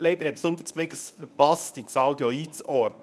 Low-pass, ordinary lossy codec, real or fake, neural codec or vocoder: none; none; fake; codec, 24 kHz, 0.9 kbps, WavTokenizer, medium speech release version 1